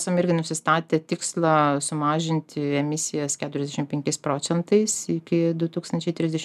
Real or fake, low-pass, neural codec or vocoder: real; 14.4 kHz; none